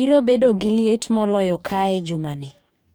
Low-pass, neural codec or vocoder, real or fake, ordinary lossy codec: none; codec, 44.1 kHz, 2.6 kbps, DAC; fake; none